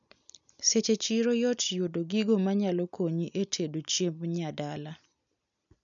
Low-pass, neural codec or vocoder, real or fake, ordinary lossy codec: 7.2 kHz; none; real; none